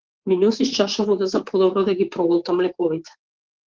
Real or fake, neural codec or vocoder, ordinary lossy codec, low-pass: fake; codec, 24 kHz, 3.1 kbps, DualCodec; Opus, 16 kbps; 7.2 kHz